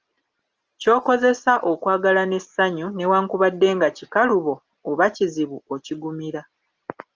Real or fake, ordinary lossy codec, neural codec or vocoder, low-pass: real; Opus, 24 kbps; none; 7.2 kHz